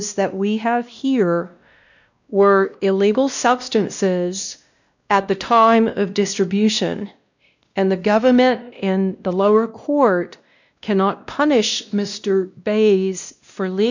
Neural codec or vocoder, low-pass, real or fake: codec, 16 kHz, 1 kbps, X-Codec, WavLM features, trained on Multilingual LibriSpeech; 7.2 kHz; fake